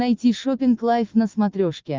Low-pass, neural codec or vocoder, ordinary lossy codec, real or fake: 7.2 kHz; none; Opus, 24 kbps; real